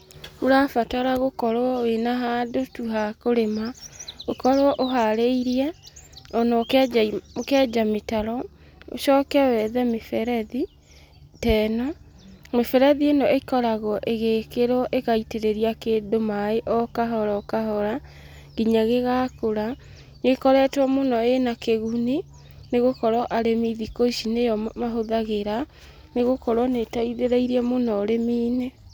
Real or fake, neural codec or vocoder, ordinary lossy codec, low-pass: real; none; none; none